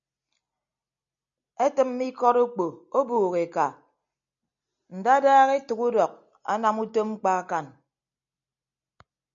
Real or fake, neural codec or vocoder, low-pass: real; none; 7.2 kHz